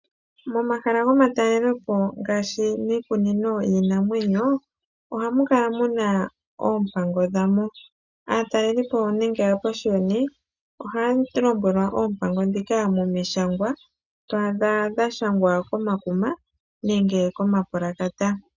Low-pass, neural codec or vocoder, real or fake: 7.2 kHz; none; real